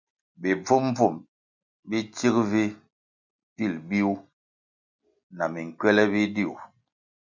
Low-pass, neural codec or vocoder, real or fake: 7.2 kHz; none; real